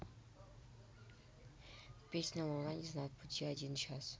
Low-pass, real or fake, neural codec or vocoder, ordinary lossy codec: none; real; none; none